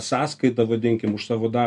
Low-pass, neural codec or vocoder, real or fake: 10.8 kHz; none; real